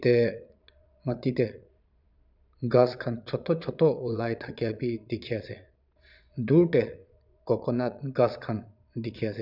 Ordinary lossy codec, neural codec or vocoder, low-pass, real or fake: none; vocoder, 22.05 kHz, 80 mel bands, Vocos; 5.4 kHz; fake